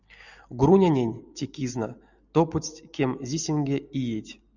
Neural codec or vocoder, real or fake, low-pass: none; real; 7.2 kHz